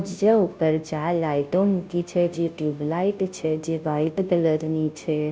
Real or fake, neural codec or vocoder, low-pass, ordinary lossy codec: fake; codec, 16 kHz, 0.5 kbps, FunCodec, trained on Chinese and English, 25 frames a second; none; none